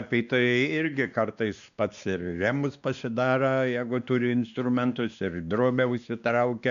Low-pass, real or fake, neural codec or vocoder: 7.2 kHz; fake; codec, 16 kHz, 2 kbps, X-Codec, WavLM features, trained on Multilingual LibriSpeech